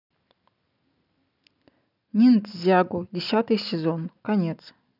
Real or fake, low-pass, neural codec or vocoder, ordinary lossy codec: real; 5.4 kHz; none; none